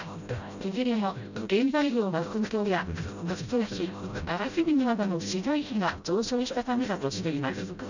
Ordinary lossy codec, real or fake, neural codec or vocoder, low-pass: none; fake; codec, 16 kHz, 0.5 kbps, FreqCodec, smaller model; 7.2 kHz